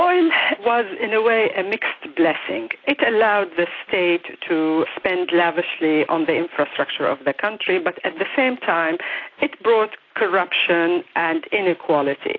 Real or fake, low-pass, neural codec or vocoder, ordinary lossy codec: real; 7.2 kHz; none; AAC, 32 kbps